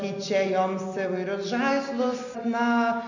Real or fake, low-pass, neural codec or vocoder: real; 7.2 kHz; none